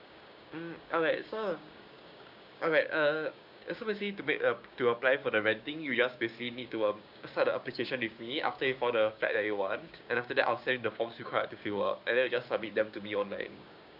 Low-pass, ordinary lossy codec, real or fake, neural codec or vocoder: 5.4 kHz; none; fake; codec, 16 kHz, 6 kbps, DAC